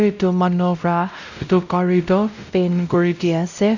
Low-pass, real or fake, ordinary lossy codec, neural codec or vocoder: 7.2 kHz; fake; none; codec, 16 kHz, 0.5 kbps, X-Codec, WavLM features, trained on Multilingual LibriSpeech